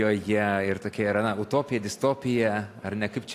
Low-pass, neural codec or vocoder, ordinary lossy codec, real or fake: 14.4 kHz; none; AAC, 64 kbps; real